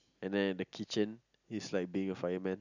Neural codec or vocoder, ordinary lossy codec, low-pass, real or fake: none; none; 7.2 kHz; real